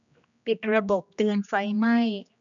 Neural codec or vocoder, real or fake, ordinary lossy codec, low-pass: codec, 16 kHz, 1 kbps, X-Codec, HuBERT features, trained on general audio; fake; none; 7.2 kHz